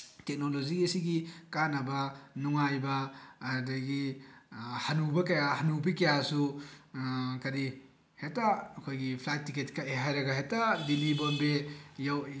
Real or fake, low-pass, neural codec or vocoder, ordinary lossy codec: real; none; none; none